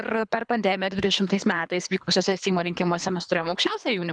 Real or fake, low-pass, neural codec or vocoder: fake; 9.9 kHz; codec, 24 kHz, 3 kbps, HILCodec